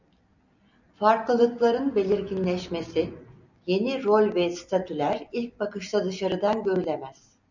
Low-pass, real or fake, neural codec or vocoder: 7.2 kHz; real; none